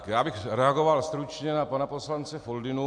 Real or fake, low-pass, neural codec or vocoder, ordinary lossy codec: real; 9.9 kHz; none; AAC, 64 kbps